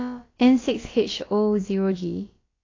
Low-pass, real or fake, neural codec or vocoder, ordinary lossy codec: 7.2 kHz; fake; codec, 16 kHz, about 1 kbps, DyCAST, with the encoder's durations; AAC, 32 kbps